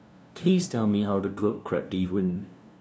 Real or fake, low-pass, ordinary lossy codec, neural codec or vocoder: fake; none; none; codec, 16 kHz, 0.5 kbps, FunCodec, trained on LibriTTS, 25 frames a second